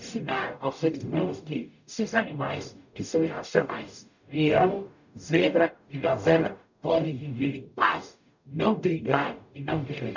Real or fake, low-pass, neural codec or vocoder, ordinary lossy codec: fake; 7.2 kHz; codec, 44.1 kHz, 0.9 kbps, DAC; none